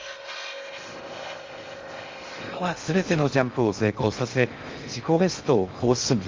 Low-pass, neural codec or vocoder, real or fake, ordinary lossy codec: 7.2 kHz; codec, 16 kHz in and 24 kHz out, 0.6 kbps, FocalCodec, streaming, 4096 codes; fake; Opus, 32 kbps